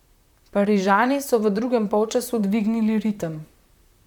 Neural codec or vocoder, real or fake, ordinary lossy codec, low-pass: vocoder, 44.1 kHz, 128 mel bands, Pupu-Vocoder; fake; none; 19.8 kHz